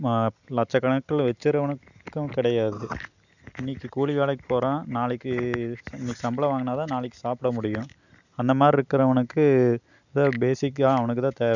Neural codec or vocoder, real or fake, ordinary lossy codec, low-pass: none; real; none; 7.2 kHz